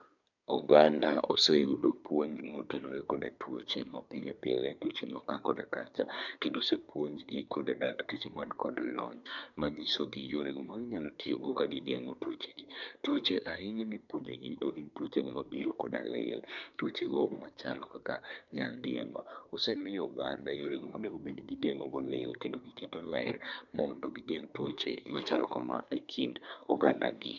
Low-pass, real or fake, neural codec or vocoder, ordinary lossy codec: 7.2 kHz; fake; codec, 24 kHz, 1 kbps, SNAC; none